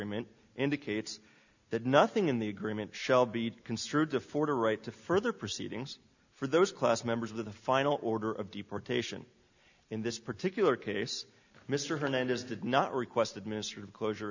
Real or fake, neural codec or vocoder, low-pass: real; none; 7.2 kHz